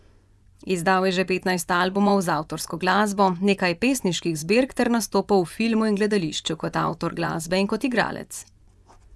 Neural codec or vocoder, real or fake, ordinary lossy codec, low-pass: vocoder, 24 kHz, 100 mel bands, Vocos; fake; none; none